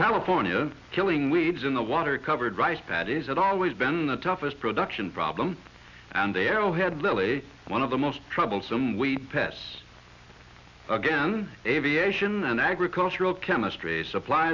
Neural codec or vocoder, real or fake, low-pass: none; real; 7.2 kHz